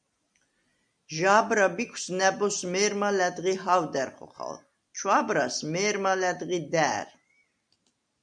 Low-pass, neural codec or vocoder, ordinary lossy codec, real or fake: 9.9 kHz; none; MP3, 96 kbps; real